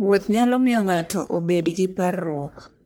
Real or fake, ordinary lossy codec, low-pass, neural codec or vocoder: fake; none; none; codec, 44.1 kHz, 1.7 kbps, Pupu-Codec